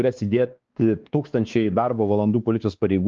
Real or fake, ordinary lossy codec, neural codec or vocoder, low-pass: fake; Opus, 24 kbps; codec, 16 kHz, 2 kbps, X-Codec, WavLM features, trained on Multilingual LibriSpeech; 7.2 kHz